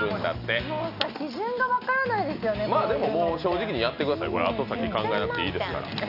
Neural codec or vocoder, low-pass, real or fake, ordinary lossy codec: none; 5.4 kHz; real; none